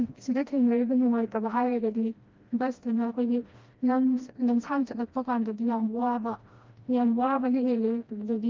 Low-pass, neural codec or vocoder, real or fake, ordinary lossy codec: 7.2 kHz; codec, 16 kHz, 1 kbps, FreqCodec, smaller model; fake; Opus, 24 kbps